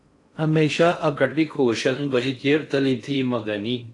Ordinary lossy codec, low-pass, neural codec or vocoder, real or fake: AAC, 48 kbps; 10.8 kHz; codec, 16 kHz in and 24 kHz out, 0.6 kbps, FocalCodec, streaming, 4096 codes; fake